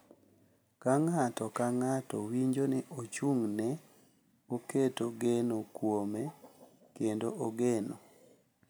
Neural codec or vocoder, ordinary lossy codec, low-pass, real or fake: none; none; none; real